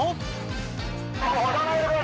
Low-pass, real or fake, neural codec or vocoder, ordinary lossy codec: none; real; none; none